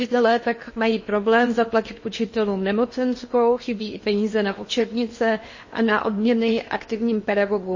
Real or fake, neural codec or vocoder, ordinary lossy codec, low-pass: fake; codec, 16 kHz in and 24 kHz out, 0.8 kbps, FocalCodec, streaming, 65536 codes; MP3, 32 kbps; 7.2 kHz